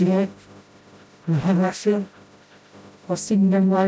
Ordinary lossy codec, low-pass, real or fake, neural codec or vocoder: none; none; fake; codec, 16 kHz, 0.5 kbps, FreqCodec, smaller model